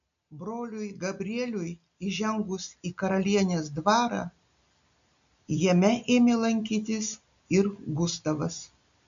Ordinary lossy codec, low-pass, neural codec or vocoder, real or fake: MP3, 64 kbps; 7.2 kHz; none; real